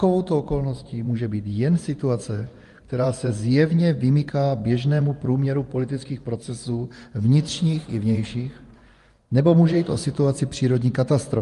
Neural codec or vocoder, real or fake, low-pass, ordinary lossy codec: vocoder, 24 kHz, 100 mel bands, Vocos; fake; 10.8 kHz; Opus, 32 kbps